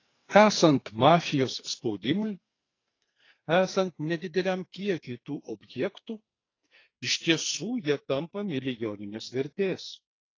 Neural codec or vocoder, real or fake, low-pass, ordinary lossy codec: codec, 44.1 kHz, 2.6 kbps, SNAC; fake; 7.2 kHz; AAC, 32 kbps